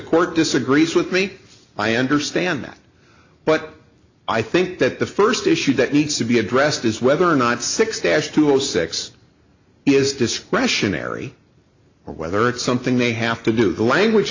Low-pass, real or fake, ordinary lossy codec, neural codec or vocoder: 7.2 kHz; real; AAC, 48 kbps; none